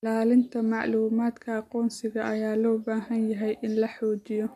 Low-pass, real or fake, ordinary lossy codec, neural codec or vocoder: 14.4 kHz; real; MP3, 64 kbps; none